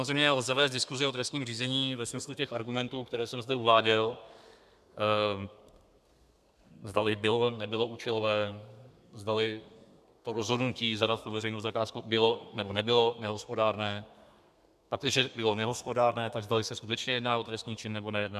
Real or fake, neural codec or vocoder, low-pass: fake; codec, 32 kHz, 1.9 kbps, SNAC; 14.4 kHz